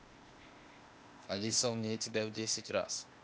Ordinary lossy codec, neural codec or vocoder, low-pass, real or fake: none; codec, 16 kHz, 0.8 kbps, ZipCodec; none; fake